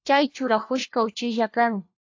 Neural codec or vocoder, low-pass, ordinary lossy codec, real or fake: codec, 16 kHz, 1 kbps, FunCodec, trained on LibriTTS, 50 frames a second; 7.2 kHz; AAC, 48 kbps; fake